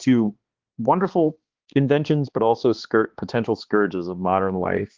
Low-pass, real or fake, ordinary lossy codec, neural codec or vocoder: 7.2 kHz; fake; Opus, 16 kbps; codec, 16 kHz, 2 kbps, X-Codec, HuBERT features, trained on balanced general audio